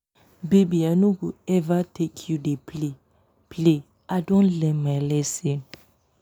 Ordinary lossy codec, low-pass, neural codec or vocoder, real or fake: none; none; none; real